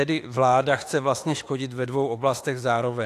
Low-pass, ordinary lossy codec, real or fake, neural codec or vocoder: 14.4 kHz; AAC, 64 kbps; fake; autoencoder, 48 kHz, 32 numbers a frame, DAC-VAE, trained on Japanese speech